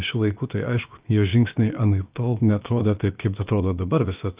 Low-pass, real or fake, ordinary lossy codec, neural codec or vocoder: 3.6 kHz; fake; Opus, 24 kbps; codec, 16 kHz, about 1 kbps, DyCAST, with the encoder's durations